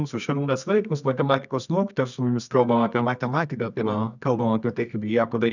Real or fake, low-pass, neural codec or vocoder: fake; 7.2 kHz; codec, 24 kHz, 0.9 kbps, WavTokenizer, medium music audio release